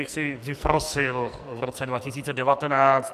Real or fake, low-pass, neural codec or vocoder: fake; 14.4 kHz; codec, 44.1 kHz, 2.6 kbps, SNAC